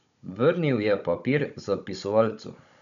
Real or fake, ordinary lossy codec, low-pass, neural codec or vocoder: fake; none; 7.2 kHz; codec, 16 kHz, 16 kbps, FunCodec, trained on Chinese and English, 50 frames a second